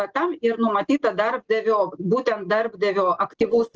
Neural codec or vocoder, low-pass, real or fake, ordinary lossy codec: none; 7.2 kHz; real; Opus, 16 kbps